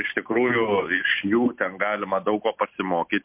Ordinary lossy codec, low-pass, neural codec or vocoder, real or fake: MP3, 32 kbps; 3.6 kHz; none; real